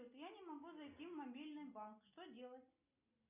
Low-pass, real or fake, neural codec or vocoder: 3.6 kHz; real; none